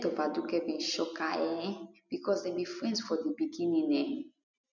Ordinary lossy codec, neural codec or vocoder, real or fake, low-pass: none; none; real; 7.2 kHz